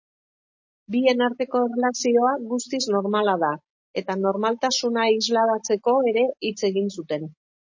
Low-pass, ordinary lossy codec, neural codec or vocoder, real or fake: 7.2 kHz; MP3, 32 kbps; none; real